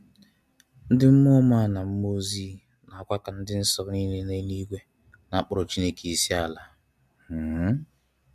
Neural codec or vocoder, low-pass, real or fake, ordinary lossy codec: none; 14.4 kHz; real; MP3, 96 kbps